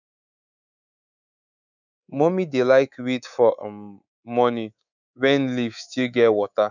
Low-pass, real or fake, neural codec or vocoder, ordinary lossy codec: 7.2 kHz; fake; codec, 24 kHz, 3.1 kbps, DualCodec; none